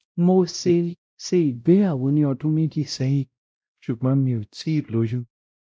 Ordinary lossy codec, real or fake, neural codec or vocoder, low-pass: none; fake; codec, 16 kHz, 0.5 kbps, X-Codec, WavLM features, trained on Multilingual LibriSpeech; none